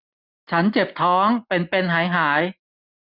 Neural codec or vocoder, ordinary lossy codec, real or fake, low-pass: none; none; real; 5.4 kHz